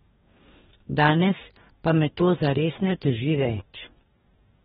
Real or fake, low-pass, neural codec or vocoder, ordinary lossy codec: fake; 19.8 kHz; codec, 44.1 kHz, 2.6 kbps, DAC; AAC, 16 kbps